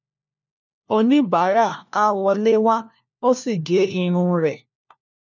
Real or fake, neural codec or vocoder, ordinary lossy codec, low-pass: fake; codec, 16 kHz, 1 kbps, FunCodec, trained on LibriTTS, 50 frames a second; none; 7.2 kHz